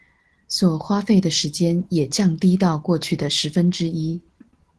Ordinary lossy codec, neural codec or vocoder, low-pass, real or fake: Opus, 16 kbps; none; 10.8 kHz; real